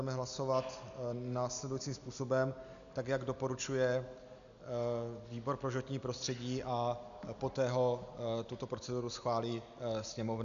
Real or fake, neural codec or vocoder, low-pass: real; none; 7.2 kHz